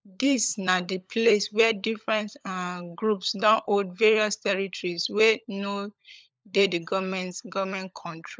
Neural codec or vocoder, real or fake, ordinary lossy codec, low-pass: codec, 16 kHz, 16 kbps, FunCodec, trained on LibriTTS, 50 frames a second; fake; none; none